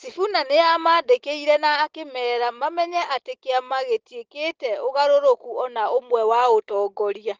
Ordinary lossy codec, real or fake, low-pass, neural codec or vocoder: Opus, 16 kbps; real; 7.2 kHz; none